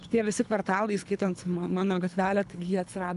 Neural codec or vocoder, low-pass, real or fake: codec, 24 kHz, 3 kbps, HILCodec; 10.8 kHz; fake